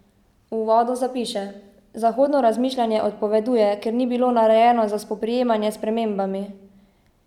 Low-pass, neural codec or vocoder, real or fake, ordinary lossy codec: 19.8 kHz; none; real; none